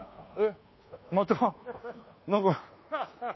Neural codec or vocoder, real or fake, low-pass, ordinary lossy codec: codec, 24 kHz, 1.2 kbps, DualCodec; fake; 7.2 kHz; MP3, 24 kbps